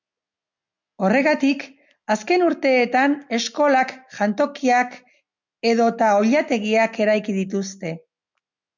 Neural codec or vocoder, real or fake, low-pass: none; real; 7.2 kHz